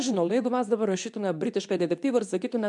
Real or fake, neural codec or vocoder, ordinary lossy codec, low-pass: fake; codec, 24 kHz, 0.9 kbps, WavTokenizer, medium speech release version 2; MP3, 96 kbps; 10.8 kHz